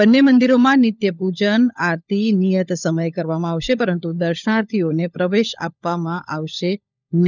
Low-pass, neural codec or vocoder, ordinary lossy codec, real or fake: 7.2 kHz; codec, 16 kHz, 16 kbps, FunCodec, trained on LibriTTS, 50 frames a second; none; fake